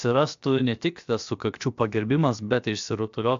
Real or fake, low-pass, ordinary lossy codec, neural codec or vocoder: fake; 7.2 kHz; AAC, 64 kbps; codec, 16 kHz, about 1 kbps, DyCAST, with the encoder's durations